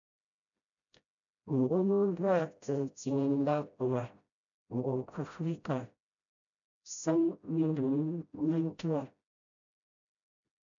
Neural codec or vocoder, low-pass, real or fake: codec, 16 kHz, 0.5 kbps, FreqCodec, smaller model; 7.2 kHz; fake